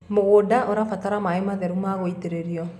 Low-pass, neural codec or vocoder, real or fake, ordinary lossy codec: 14.4 kHz; none; real; none